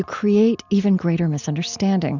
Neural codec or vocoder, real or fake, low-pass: none; real; 7.2 kHz